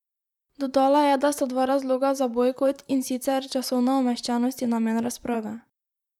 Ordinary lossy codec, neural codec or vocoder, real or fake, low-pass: none; vocoder, 44.1 kHz, 128 mel bands, Pupu-Vocoder; fake; 19.8 kHz